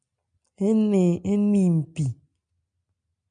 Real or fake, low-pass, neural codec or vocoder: real; 9.9 kHz; none